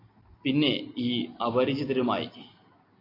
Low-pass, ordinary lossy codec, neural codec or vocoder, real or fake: 5.4 kHz; AAC, 32 kbps; none; real